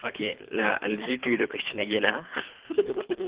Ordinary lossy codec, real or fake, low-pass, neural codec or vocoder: Opus, 16 kbps; fake; 3.6 kHz; codec, 24 kHz, 3 kbps, HILCodec